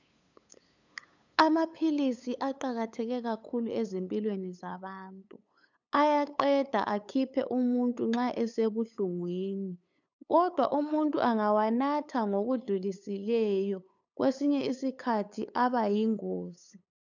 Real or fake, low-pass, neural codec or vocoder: fake; 7.2 kHz; codec, 16 kHz, 8 kbps, FunCodec, trained on LibriTTS, 25 frames a second